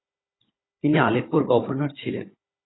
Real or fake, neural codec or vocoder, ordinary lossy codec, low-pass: fake; codec, 16 kHz, 16 kbps, FunCodec, trained on Chinese and English, 50 frames a second; AAC, 16 kbps; 7.2 kHz